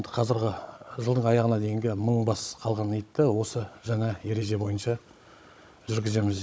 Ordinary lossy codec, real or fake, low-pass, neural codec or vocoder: none; fake; none; codec, 16 kHz, 16 kbps, FunCodec, trained on Chinese and English, 50 frames a second